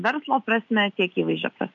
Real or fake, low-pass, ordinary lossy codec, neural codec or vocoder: real; 7.2 kHz; MP3, 64 kbps; none